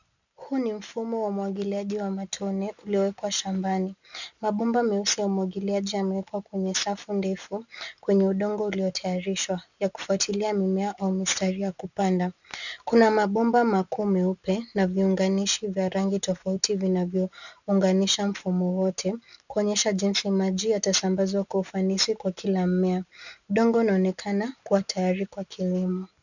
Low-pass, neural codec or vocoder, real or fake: 7.2 kHz; none; real